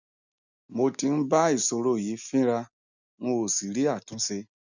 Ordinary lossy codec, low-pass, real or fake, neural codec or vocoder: none; 7.2 kHz; real; none